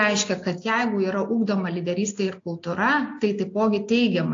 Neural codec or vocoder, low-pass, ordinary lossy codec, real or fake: none; 7.2 kHz; AAC, 48 kbps; real